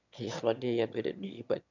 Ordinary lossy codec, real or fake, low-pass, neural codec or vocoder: none; fake; 7.2 kHz; autoencoder, 22.05 kHz, a latent of 192 numbers a frame, VITS, trained on one speaker